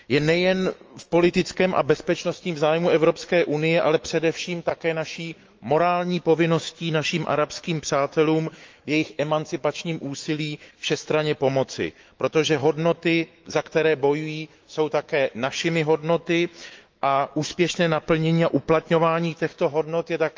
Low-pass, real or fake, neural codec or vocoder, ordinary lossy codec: 7.2 kHz; fake; autoencoder, 48 kHz, 128 numbers a frame, DAC-VAE, trained on Japanese speech; Opus, 24 kbps